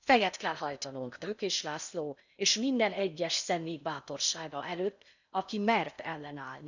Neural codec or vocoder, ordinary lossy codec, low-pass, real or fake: codec, 16 kHz in and 24 kHz out, 0.6 kbps, FocalCodec, streaming, 4096 codes; none; 7.2 kHz; fake